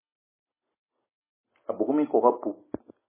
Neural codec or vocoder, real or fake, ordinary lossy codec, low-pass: none; real; MP3, 16 kbps; 3.6 kHz